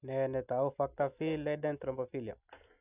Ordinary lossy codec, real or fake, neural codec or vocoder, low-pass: none; real; none; 3.6 kHz